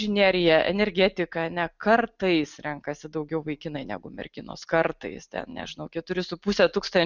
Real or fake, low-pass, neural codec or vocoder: real; 7.2 kHz; none